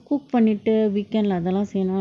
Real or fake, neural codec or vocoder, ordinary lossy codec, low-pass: real; none; none; none